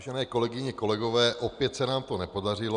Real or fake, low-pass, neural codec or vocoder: real; 9.9 kHz; none